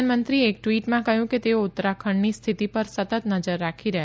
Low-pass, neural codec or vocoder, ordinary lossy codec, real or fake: none; none; none; real